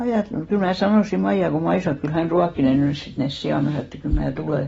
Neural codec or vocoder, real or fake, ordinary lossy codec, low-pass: none; real; AAC, 24 kbps; 19.8 kHz